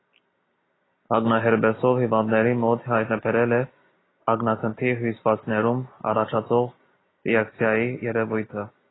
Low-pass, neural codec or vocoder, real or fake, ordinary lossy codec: 7.2 kHz; none; real; AAC, 16 kbps